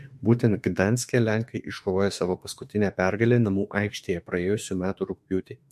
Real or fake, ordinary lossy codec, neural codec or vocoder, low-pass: fake; MP3, 64 kbps; autoencoder, 48 kHz, 32 numbers a frame, DAC-VAE, trained on Japanese speech; 14.4 kHz